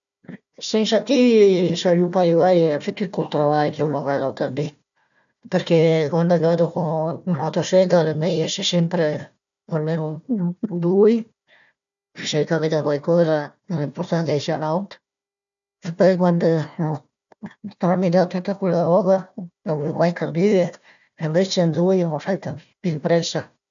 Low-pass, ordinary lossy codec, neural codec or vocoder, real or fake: 7.2 kHz; none; codec, 16 kHz, 1 kbps, FunCodec, trained on Chinese and English, 50 frames a second; fake